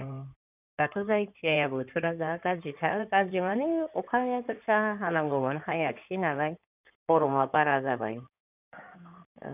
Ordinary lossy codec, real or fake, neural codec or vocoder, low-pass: none; fake; codec, 16 kHz in and 24 kHz out, 2.2 kbps, FireRedTTS-2 codec; 3.6 kHz